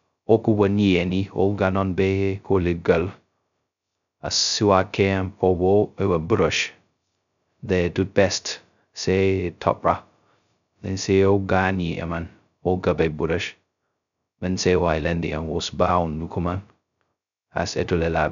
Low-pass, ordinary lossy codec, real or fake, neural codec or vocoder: 7.2 kHz; none; fake; codec, 16 kHz, 0.2 kbps, FocalCodec